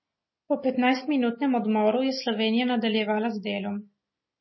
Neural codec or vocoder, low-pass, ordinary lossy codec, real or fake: none; 7.2 kHz; MP3, 24 kbps; real